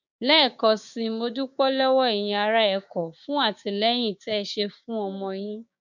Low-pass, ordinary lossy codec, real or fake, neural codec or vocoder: 7.2 kHz; none; fake; codec, 16 kHz, 6 kbps, DAC